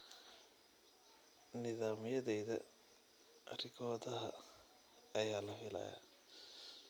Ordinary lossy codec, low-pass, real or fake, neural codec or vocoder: none; none; real; none